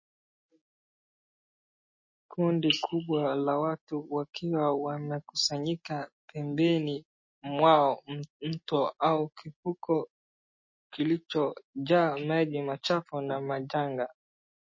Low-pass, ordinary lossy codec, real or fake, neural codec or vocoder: 7.2 kHz; MP3, 32 kbps; real; none